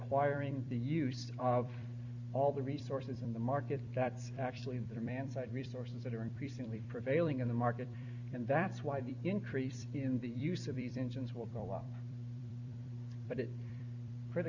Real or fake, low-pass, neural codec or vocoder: fake; 7.2 kHz; vocoder, 44.1 kHz, 128 mel bands every 256 samples, BigVGAN v2